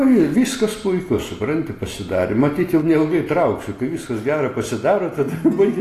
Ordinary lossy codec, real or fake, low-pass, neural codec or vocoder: AAC, 48 kbps; real; 14.4 kHz; none